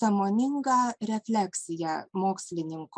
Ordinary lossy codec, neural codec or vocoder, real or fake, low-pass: MP3, 96 kbps; none; real; 9.9 kHz